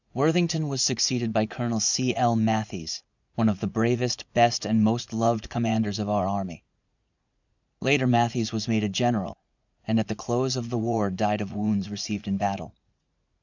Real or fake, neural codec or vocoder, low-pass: fake; vocoder, 44.1 kHz, 80 mel bands, Vocos; 7.2 kHz